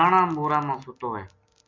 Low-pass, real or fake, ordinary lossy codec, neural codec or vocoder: 7.2 kHz; real; MP3, 48 kbps; none